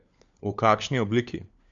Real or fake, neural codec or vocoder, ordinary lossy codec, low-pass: fake; codec, 16 kHz, 4 kbps, FunCodec, trained on LibriTTS, 50 frames a second; none; 7.2 kHz